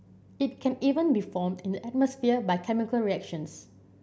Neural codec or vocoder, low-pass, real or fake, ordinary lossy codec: none; none; real; none